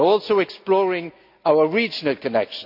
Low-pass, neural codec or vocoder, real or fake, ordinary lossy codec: 5.4 kHz; none; real; none